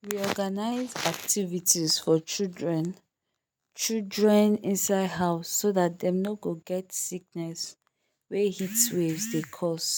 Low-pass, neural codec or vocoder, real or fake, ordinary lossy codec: none; none; real; none